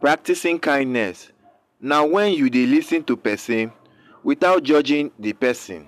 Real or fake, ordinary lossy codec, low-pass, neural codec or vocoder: real; MP3, 96 kbps; 14.4 kHz; none